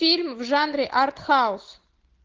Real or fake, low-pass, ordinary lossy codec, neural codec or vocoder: real; 7.2 kHz; Opus, 16 kbps; none